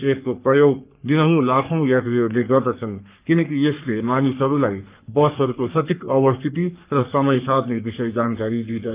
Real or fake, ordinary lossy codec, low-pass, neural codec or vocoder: fake; Opus, 24 kbps; 3.6 kHz; codec, 44.1 kHz, 3.4 kbps, Pupu-Codec